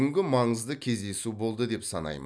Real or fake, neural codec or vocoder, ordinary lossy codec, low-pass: real; none; none; none